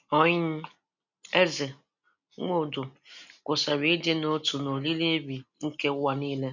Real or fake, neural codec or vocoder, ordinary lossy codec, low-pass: real; none; none; 7.2 kHz